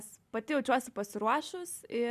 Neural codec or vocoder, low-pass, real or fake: none; 14.4 kHz; real